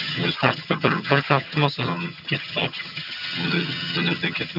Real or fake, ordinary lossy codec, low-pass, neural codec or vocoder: fake; none; 5.4 kHz; vocoder, 22.05 kHz, 80 mel bands, HiFi-GAN